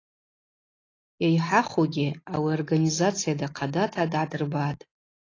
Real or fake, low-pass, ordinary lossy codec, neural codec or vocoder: real; 7.2 kHz; AAC, 32 kbps; none